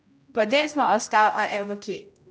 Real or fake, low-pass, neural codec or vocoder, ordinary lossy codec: fake; none; codec, 16 kHz, 0.5 kbps, X-Codec, HuBERT features, trained on general audio; none